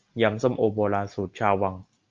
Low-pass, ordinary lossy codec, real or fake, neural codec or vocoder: 7.2 kHz; Opus, 24 kbps; real; none